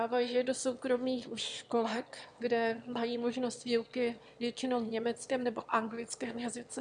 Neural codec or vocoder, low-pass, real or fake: autoencoder, 22.05 kHz, a latent of 192 numbers a frame, VITS, trained on one speaker; 9.9 kHz; fake